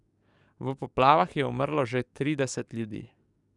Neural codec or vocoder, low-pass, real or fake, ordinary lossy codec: codec, 44.1 kHz, 7.8 kbps, DAC; 10.8 kHz; fake; none